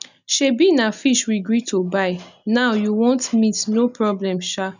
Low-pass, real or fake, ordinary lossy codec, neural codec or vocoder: 7.2 kHz; real; none; none